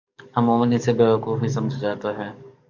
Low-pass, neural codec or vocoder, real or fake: 7.2 kHz; codec, 44.1 kHz, 7.8 kbps, DAC; fake